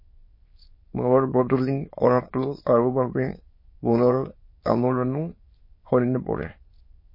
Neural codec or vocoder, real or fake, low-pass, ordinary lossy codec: autoencoder, 22.05 kHz, a latent of 192 numbers a frame, VITS, trained on many speakers; fake; 5.4 kHz; MP3, 24 kbps